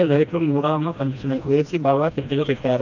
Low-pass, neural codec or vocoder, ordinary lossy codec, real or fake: 7.2 kHz; codec, 16 kHz, 1 kbps, FreqCodec, smaller model; none; fake